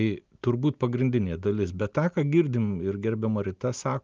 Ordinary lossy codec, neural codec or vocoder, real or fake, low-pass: Opus, 32 kbps; none; real; 7.2 kHz